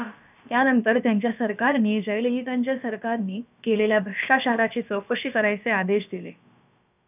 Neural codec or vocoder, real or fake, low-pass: codec, 16 kHz, about 1 kbps, DyCAST, with the encoder's durations; fake; 3.6 kHz